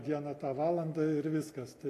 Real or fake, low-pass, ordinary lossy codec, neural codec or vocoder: real; 14.4 kHz; AAC, 48 kbps; none